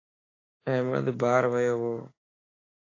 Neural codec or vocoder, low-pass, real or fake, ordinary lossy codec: codec, 16 kHz, 6 kbps, DAC; 7.2 kHz; fake; AAC, 32 kbps